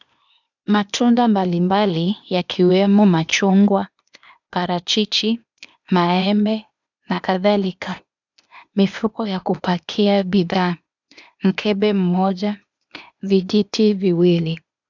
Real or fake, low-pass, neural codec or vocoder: fake; 7.2 kHz; codec, 16 kHz, 0.8 kbps, ZipCodec